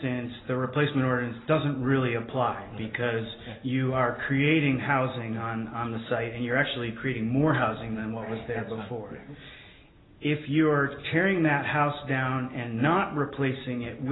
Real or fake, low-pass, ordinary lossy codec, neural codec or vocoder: real; 7.2 kHz; AAC, 16 kbps; none